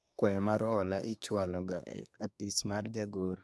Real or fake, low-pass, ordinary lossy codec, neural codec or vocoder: fake; none; none; codec, 24 kHz, 1 kbps, SNAC